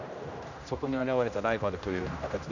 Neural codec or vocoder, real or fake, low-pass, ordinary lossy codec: codec, 16 kHz, 1 kbps, X-Codec, HuBERT features, trained on general audio; fake; 7.2 kHz; none